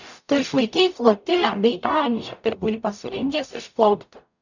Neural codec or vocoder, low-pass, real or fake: codec, 44.1 kHz, 0.9 kbps, DAC; 7.2 kHz; fake